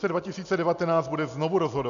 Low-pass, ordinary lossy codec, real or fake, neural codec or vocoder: 7.2 kHz; AAC, 96 kbps; real; none